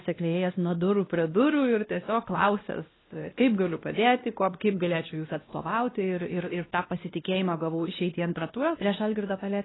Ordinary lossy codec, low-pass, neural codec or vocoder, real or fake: AAC, 16 kbps; 7.2 kHz; codec, 16 kHz, 1 kbps, X-Codec, WavLM features, trained on Multilingual LibriSpeech; fake